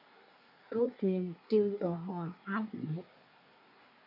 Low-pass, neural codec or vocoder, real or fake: 5.4 kHz; codec, 24 kHz, 1 kbps, SNAC; fake